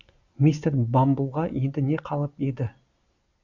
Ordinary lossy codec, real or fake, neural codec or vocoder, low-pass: Opus, 64 kbps; real; none; 7.2 kHz